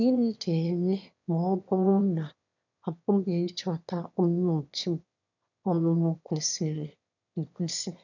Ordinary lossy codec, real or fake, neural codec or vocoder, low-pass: none; fake; autoencoder, 22.05 kHz, a latent of 192 numbers a frame, VITS, trained on one speaker; 7.2 kHz